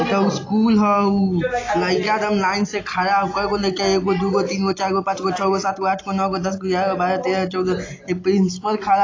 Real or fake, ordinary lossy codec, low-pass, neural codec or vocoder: real; AAC, 32 kbps; 7.2 kHz; none